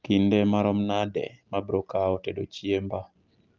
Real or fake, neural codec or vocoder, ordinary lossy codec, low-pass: real; none; Opus, 32 kbps; 7.2 kHz